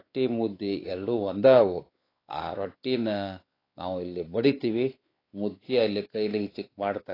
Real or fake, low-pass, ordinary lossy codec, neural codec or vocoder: fake; 5.4 kHz; AAC, 24 kbps; codec, 16 kHz, 4 kbps, X-Codec, WavLM features, trained on Multilingual LibriSpeech